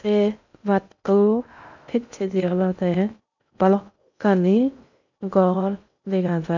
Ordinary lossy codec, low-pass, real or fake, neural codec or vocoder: none; 7.2 kHz; fake; codec, 16 kHz in and 24 kHz out, 0.6 kbps, FocalCodec, streaming, 2048 codes